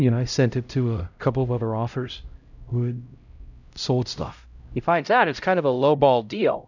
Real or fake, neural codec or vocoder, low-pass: fake; codec, 16 kHz, 0.5 kbps, X-Codec, HuBERT features, trained on LibriSpeech; 7.2 kHz